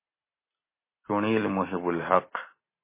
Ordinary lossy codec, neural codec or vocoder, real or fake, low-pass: MP3, 16 kbps; none; real; 3.6 kHz